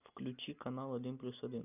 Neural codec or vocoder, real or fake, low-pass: none; real; 3.6 kHz